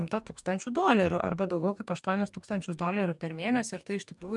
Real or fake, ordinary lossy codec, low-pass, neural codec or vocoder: fake; MP3, 96 kbps; 10.8 kHz; codec, 44.1 kHz, 2.6 kbps, DAC